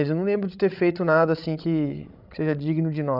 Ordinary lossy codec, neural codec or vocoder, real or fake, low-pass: none; codec, 16 kHz, 16 kbps, FreqCodec, larger model; fake; 5.4 kHz